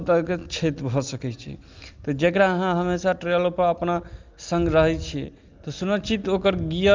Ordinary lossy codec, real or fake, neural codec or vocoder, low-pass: Opus, 32 kbps; real; none; 7.2 kHz